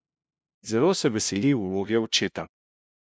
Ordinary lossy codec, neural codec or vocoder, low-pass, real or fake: none; codec, 16 kHz, 0.5 kbps, FunCodec, trained on LibriTTS, 25 frames a second; none; fake